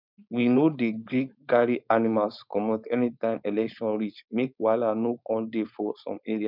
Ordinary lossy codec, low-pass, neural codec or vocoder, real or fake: none; 5.4 kHz; codec, 16 kHz, 4.8 kbps, FACodec; fake